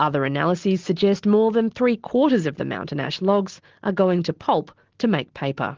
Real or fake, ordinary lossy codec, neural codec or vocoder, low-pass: real; Opus, 16 kbps; none; 7.2 kHz